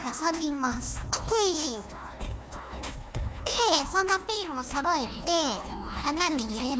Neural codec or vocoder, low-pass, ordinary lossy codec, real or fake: codec, 16 kHz, 1 kbps, FunCodec, trained on Chinese and English, 50 frames a second; none; none; fake